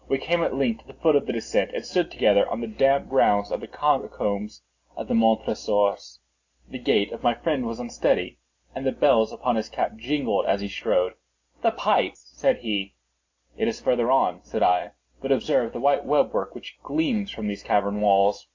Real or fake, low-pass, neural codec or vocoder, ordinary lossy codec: real; 7.2 kHz; none; AAC, 48 kbps